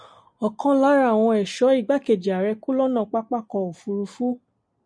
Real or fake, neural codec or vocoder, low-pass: real; none; 9.9 kHz